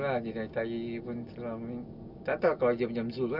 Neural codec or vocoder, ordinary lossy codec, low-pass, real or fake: none; none; 5.4 kHz; real